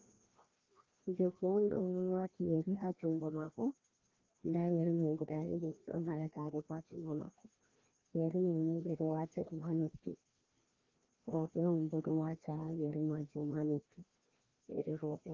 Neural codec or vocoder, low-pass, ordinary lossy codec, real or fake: codec, 16 kHz, 1 kbps, FreqCodec, larger model; 7.2 kHz; Opus, 16 kbps; fake